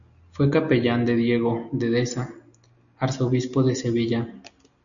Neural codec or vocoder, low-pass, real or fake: none; 7.2 kHz; real